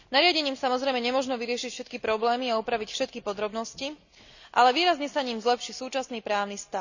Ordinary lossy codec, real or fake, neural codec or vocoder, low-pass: none; real; none; 7.2 kHz